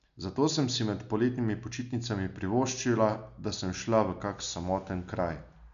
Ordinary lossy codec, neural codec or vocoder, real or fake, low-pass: none; none; real; 7.2 kHz